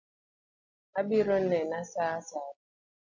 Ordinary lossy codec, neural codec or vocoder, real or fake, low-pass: AAC, 48 kbps; none; real; 7.2 kHz